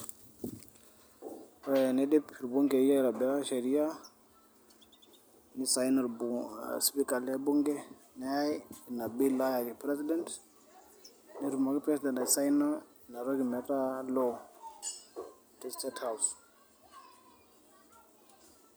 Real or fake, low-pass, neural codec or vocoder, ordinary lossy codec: real; none; none; none